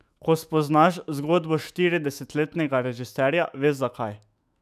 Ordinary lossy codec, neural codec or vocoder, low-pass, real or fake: none; autoencoder, 48 kHz, 128 numbers a frame, DAC-VAE, trained on Japanese speech; 14.4 kHz; fake